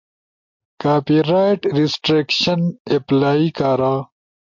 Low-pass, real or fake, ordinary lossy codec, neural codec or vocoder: 7.2 kHz; real; MP3, 48 kbps; none